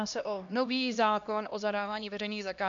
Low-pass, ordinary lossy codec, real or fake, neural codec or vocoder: 7.2 kHz; MP3, 64 kbps; fake; codec, 16 kHz, 1 kbps, X-Codec, HuBERT features, trained on LibriSpeech